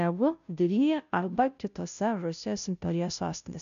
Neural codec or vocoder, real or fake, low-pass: codec, 16 kHz, 0.5 kbps, FunCodec, trained on Chinese and English, 25 frames a second; fake; 7.2 kHz